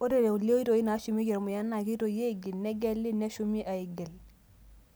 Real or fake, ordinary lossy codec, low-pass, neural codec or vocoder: real; none; none; none